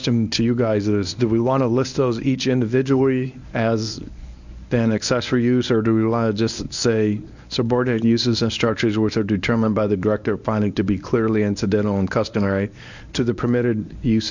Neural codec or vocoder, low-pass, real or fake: codec, 24 kHz, 0.9 kbps, WavTokenizer, medium speech release version 1; 7.2 kHz; fake